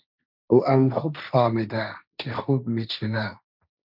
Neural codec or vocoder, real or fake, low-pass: codec, 16 kHz, 1.1 kbps, Voila-Tokenizer; fake; 5.4 kHz